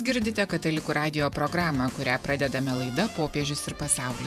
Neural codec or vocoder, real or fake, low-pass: vocoder, 48 kHz, 128 mel bands, Vocos; fake; 14.4 kHz